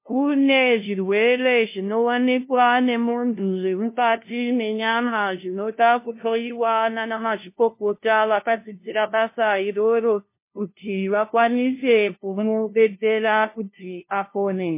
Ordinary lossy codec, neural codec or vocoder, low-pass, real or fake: MP3, 24 kbps; codec, 16 kHz, 0.5 kbps, FunCodec, trained on LibriTTS, 25 frames a second; 3.6 kHz; fake